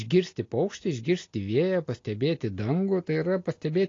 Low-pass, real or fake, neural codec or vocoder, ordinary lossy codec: 7.2 kHz; real; none; AAC, 32 kbps